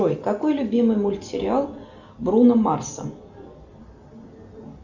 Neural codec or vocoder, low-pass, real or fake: none; 7.2 kHz; real